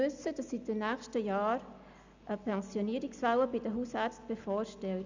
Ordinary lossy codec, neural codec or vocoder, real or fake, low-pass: Opus, 64 kbps; none; real; 7.2 kHz